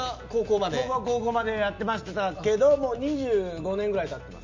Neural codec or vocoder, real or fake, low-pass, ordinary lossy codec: none; real; 7.2 kHz; MP3, 64 kbps